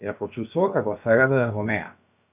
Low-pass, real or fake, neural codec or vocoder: 3.6 kHz; fake; codec, 16 kHz, about 1 kbps, DyCAST, with the encoder's durations